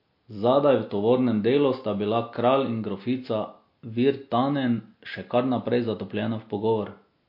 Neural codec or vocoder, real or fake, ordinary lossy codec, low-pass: none; real; MP3, 32 kbps; 5.4 kHz